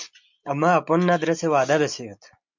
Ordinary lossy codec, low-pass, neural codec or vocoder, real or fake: MP3, 64 kbps; 7.2 kHz; none; real